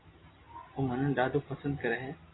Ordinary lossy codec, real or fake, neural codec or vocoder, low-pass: AAC, 16 kbps; real; none; 7.2 kHz